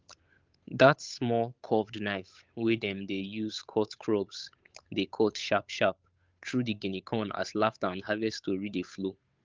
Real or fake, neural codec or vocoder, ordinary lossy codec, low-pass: fake; codec, 16 kHz, 8 kbps, FunCodec, trained on Chinese and English, 25 frames a second; Opus, 24 kbps; 7.2 kHz